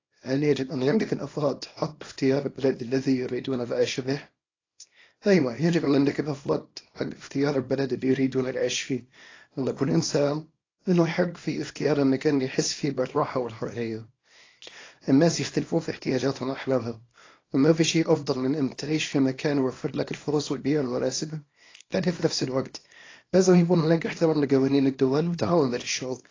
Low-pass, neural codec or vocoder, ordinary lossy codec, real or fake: 7.2 kHz; codec, 24 kHz, 0.9 kbps, WavTokenizer, small release; AAC, 32 kbps; fake